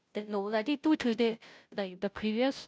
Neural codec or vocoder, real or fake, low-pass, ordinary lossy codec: codec, 16 kHz, 0.5 kbps, FunCodec, trained on Chinese and English, 25 frames a second; fake; none; none